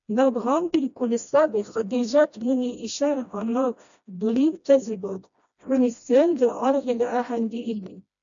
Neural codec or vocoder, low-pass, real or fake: codec, 16 kHz, 1 kbps, FreqCodec, smaller model; 7.2 kHz; fake